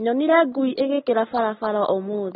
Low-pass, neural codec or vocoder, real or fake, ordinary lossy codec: 7.2 kHz; none; real; AAC, 16 kbps